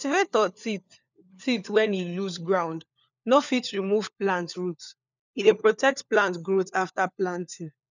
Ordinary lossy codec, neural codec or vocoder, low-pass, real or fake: none; codec, 16 kHz, 4 kbps, FunCodec, trained on LibriTTS, 50 frames a second; 7.2 kHz; fake